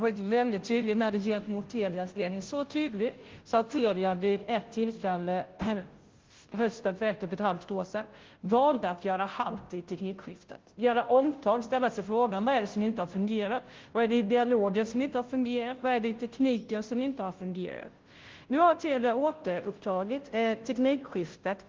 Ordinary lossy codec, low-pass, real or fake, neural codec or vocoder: Opus, 16 kbps; 7.2 kHz; fake; codec, 16 kHz, 0.5 kbps, FunCodec, trained on Chinese and English, 25 frames a second